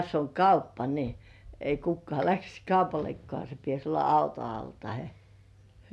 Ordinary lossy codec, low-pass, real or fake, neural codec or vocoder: none; none; real; none